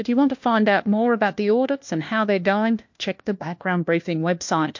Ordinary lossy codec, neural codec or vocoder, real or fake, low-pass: MP3, 48 kbps; codec, 16 kHz, 1 kbps, FunCodec, trained on LibriTTS, 50 frames a second; fake; 7.2 kHz